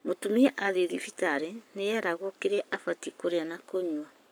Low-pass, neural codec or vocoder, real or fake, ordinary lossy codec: none; codec, 44.1 kHz, 7.8 kbps, Pupu-Codec; fake; none